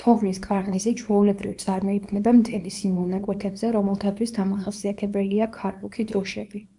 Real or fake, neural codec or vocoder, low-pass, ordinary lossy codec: fake; codec, 24 kHz, 0.9 kbps, WavTokenizer, small release; 10.8 kHz; MP3, 96 kbps